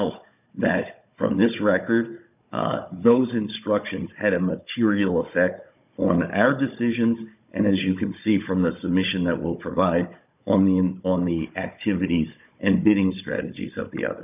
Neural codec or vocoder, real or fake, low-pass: codec, 16 kHz, 16 kbps, FunCodec, trained on Chinese and English, 50 frames a second; fake; 3.6 kHz